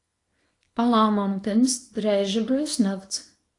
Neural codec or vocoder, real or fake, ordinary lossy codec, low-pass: codec, 24 kHz, 0.9 kbps, WavTokenizer, small release; fake; AAC, 48 kbps; 10.8 kHz